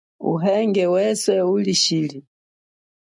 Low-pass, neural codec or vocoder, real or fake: 10.8 kHz; none; real